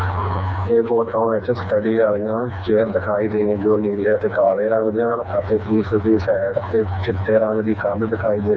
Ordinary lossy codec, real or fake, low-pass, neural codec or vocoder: none; fake; none; codec, 16 kHz, 2 kbps, FreqCodec, smaller model